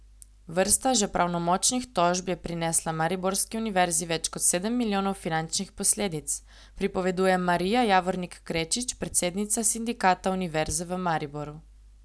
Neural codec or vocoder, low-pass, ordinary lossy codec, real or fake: none; none; none; real